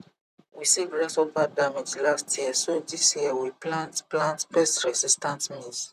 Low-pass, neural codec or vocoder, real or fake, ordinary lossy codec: 14.4 kHz; vocoder, 44.1 kHz, 128 mel bands, Pupu-Vocoder; fake; none